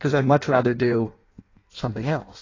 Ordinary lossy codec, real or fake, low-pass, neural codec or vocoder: AAC, 32 kbps; fake; 7.2 kHz; codec, 16 kHz in and 24 kHz out, 0.6 kbps, FireRedTTS-2 codec